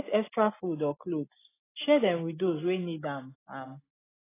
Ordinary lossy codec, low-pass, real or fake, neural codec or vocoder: AAC, 16 kbps; 3.6 kHz; real; none